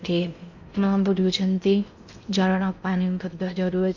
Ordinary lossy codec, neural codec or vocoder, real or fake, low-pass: none; codec, 16 kHz in and 24 kHz out, 0.6 kbps, FocalCodec, streaming, 4096 codes; fake; 7.2 kHz